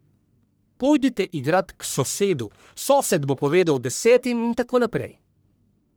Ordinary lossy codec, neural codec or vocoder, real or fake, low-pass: none; codec, 44.1 kHz, 1.7 kbps, Pupu-Codec; fake; none